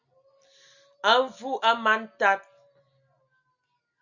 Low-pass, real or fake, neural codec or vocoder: 7.2 kHz; real; none